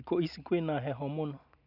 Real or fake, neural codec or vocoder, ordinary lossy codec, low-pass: real; none; none; 5.4 kHz